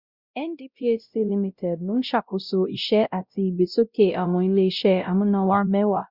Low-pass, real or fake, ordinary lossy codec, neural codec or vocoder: 5.4 kHz; fake; Opus, 64 kbps; codec, 16 kHz, 0.5 kbps, X-Codec, WavLM features, trained on Multilingual LibriSpeech